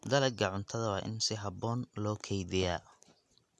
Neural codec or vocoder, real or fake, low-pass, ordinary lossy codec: none; real; none; none